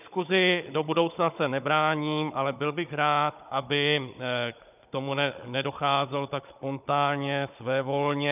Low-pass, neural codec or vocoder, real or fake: 3.6 kHz; codec, 16 kHz, 16 kbps, FunCodec, trained on Chinese and English, 50 frames a second; fake